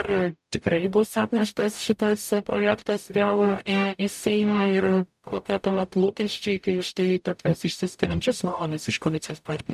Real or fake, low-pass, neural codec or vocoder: fake; 14.4 kHz; codec, 44.1 kHz, 0.9 kbps, DAC